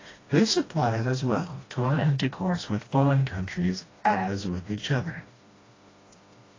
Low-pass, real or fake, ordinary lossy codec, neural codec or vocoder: 7.2 kHz; fake; AAC, 32 kbps; codec, 16 kHz, 1 kbps, FreqCodec, smaller model